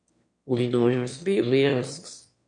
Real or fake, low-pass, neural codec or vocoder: fake; 9.9 kHz; autoencoder, 22.05 kHz, a latent of 192 numbers a frame, VITS, trained on one speaker